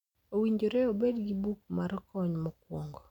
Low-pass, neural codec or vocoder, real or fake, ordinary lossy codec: 19.8 kHz; none; real; none